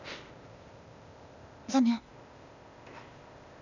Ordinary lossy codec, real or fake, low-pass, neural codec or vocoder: none; fake; 7.2 kHz; codec, 16 kHz, 0.8 kbps, ZipCodec